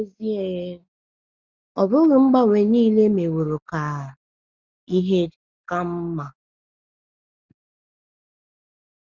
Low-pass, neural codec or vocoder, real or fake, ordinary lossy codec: 7.2 kHz; none; real; Opus, 64 kbps